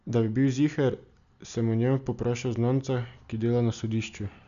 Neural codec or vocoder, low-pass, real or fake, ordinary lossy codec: none; 7.2 kHz; real; none